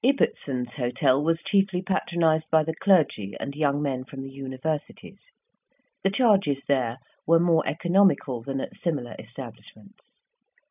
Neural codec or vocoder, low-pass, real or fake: none; 3.6 kHz; real